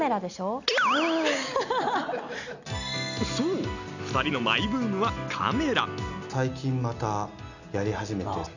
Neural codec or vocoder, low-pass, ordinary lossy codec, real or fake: none; 7.2 kHz; none; real